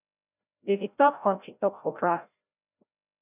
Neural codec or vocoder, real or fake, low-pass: codec, 16 kHz, 0.5 kbps, FreqCodec, larger model; fake; 3.6 kHz